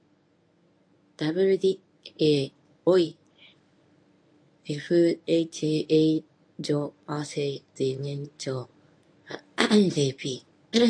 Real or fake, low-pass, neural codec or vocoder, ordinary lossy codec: fake; 9.9 kHz; codec, 24 kHz, 0.9 kbps, WavTokenizer, medium speech release version 1; AAC, 48 kbps